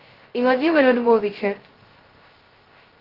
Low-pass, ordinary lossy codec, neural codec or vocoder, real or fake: 5.4 kHz; Opus, 16 kbps; codec, 16 kHz, 0.2 kbps, FocalCodec; fake